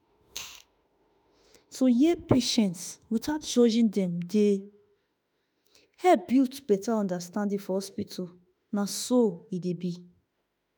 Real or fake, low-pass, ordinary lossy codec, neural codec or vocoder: fake; none; none; autoencoder, 48 kHz, 32 numbers a frame, DAC-VAE, trained on Japanese speech